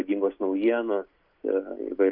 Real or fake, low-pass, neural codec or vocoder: real; 5.4 kHz; none